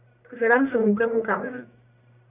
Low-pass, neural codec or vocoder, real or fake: 3.6 kHz; codec, 44.1 kHz, 1.7 kbps, Pupu-Codec; fake